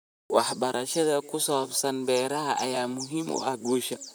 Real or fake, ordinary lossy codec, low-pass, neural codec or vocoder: fake; none; none; vocoder, 44.1 kHz, 128 mel bands, Pupu-Vocoder